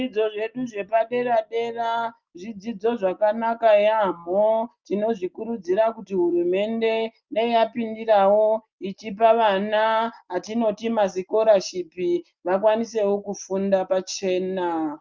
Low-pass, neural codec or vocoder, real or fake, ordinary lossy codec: 7.2 kHz; none; real; Opus, 24 kbps